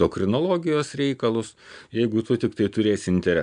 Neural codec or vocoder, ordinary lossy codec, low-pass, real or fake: none; MP3, 96 kbps; 9.9 kHz; real